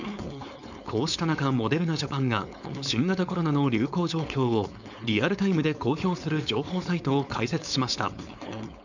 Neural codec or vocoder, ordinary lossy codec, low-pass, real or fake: codec, 16 kHz, 4.8 kbps, FACodec; none; 7.2 kHz; fake